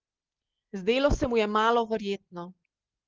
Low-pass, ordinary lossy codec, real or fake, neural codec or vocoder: 7.2 kHz; Opus, 16 kbps; real; none